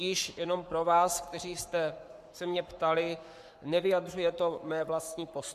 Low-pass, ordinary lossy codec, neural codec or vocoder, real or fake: 14.4 kHz; MP3, 96 kbps; codec, 44.1 kHz, 7.8 kbps, Pupu-Codec; fake